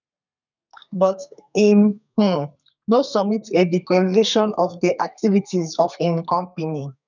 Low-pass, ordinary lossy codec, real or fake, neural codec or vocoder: 7.2 kHz; none; fake; codec, 32 kHz, 1.9 kbps, SNAC